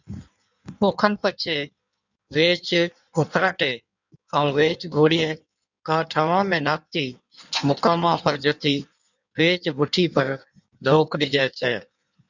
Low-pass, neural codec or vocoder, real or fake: 7.2 kHz; codec, 16 kHz in and 24 kHz out, 1.1 kbps, FireRedTTS-2 codec; fake